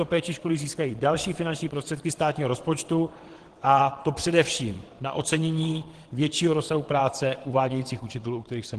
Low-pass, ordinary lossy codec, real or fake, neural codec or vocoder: 9.9 kHz; Opus, 16 kbps; fake; vocoder, 22.05 kHz, 80 mel bands, WaveNeXt